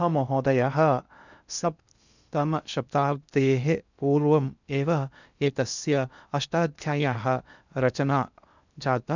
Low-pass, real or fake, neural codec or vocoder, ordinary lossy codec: 7.2 kHz; fake; codec, 16 kHz in and 24 kHz out, 0.6 kbps, FocalCodec, streaming, 4096 codes; none